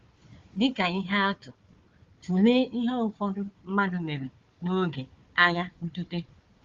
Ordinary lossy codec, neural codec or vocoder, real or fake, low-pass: Opus, 32 kbps; codec, 16 kHz, 4 kbps, FunCodec, trained on Chinese and English, 50 frames a second; fake; 7.2 kHz